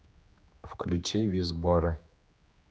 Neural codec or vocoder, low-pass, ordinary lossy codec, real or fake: codec, 16 kHz, 2 kbps, X-Codec, HuBERT features, trained on general audio; none; none; fake